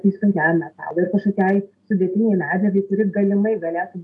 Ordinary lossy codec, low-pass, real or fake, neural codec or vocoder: AAC, 64 kbps; 10.8 kHz; real; none